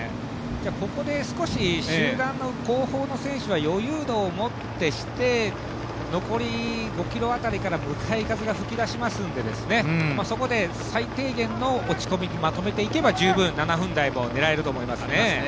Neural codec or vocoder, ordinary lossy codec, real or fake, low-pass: none; none; real; none